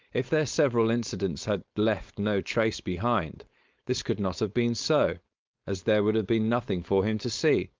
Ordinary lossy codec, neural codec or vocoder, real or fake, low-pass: Opus, 32 kbps; codec, 16 kHz, 4.8 kbps, FACodec; fake; 7.2 kHz